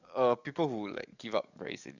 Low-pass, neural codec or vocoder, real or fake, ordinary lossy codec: 7.2 kHz; codec, 44.1 kHz, 7.8 kbps, DAC; fake; none